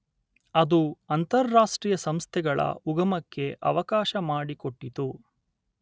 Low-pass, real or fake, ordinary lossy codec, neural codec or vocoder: none; real; none; none